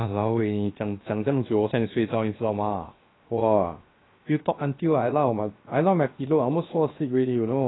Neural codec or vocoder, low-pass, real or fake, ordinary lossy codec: codec, 16 kHz, 0.7 kbps, FocalCodec; 7.2 kHz; fake; AAC, 16 kbps